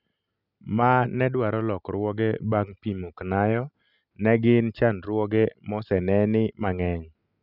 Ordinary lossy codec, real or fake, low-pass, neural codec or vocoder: none; real; 5.4 kHz; none